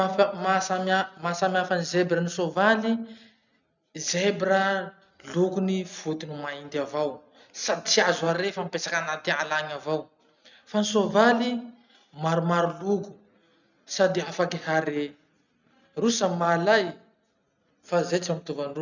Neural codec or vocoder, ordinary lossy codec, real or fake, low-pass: none; none; real; 7.2 kHz